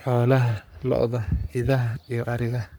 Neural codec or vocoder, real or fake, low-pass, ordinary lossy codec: codec, 44.1 kHz, 3.4 kbps, Pupu-Codec; fake; none; none